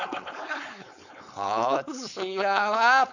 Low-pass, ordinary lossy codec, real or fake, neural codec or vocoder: 7.2 kHz; none; fake; codec, 16 kHz, 4.8 kbps, FACodec